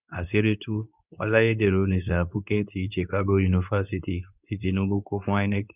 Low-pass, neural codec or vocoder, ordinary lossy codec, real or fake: 3.6 kHz; codec, 24 kHz, 0.9 kbps, WavTokenizer, medium speech release version 2; none; fake